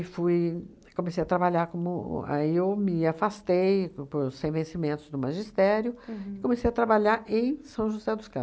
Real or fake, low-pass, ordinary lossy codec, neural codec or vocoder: real; none; none; none